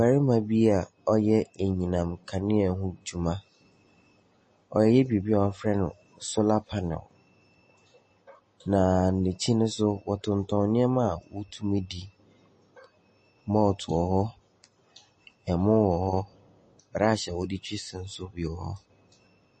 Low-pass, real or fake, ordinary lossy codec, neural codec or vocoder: 10.8 kHz; real; MP3, 32 kbps; none